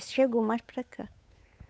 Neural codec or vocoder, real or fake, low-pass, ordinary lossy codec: codec, 16 kHz, 8 kbps, FunCodec, trained on Chinese and English, 25 frames a second; fake; none; none